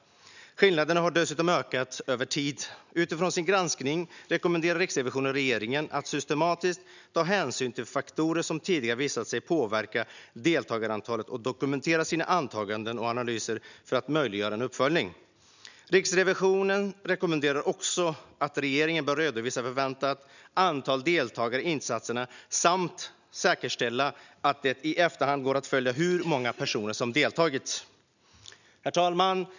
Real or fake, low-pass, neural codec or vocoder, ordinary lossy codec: real; 7.2 kHz; none; none